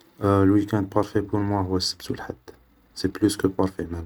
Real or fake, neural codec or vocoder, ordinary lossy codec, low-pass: real; none; none; none